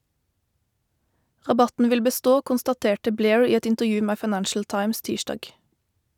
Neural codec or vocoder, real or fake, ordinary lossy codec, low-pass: none; real; none; 19.8 kHz